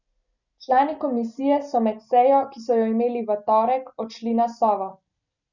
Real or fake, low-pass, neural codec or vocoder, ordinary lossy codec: real; 7.2 kHz; none; none